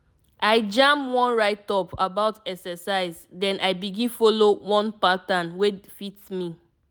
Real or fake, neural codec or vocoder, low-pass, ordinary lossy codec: real; none; none; none